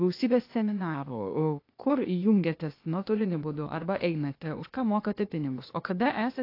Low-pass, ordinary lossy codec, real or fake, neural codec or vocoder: 5.4 kHz; AAC, 32 kbps; fake; codec, 16 kHz, 0.8 kbps, ZipCodec